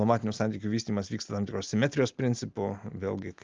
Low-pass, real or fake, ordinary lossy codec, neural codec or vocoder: 7.2 kHz; real; Opus, 24 kbps; none